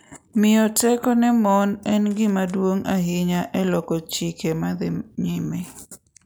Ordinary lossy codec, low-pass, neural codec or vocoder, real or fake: none; none; none; real